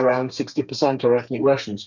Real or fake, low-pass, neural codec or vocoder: fake; 7.2 kHz; codec, 32 kHz, 1.9 kbps, SNAC